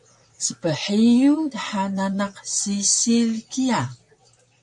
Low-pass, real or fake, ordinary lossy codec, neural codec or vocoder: 10.8 kHz; fake; MP3, 64 kbps; vocoder, 44.1 kHz, 128 mel bands, Pupu-Vocoder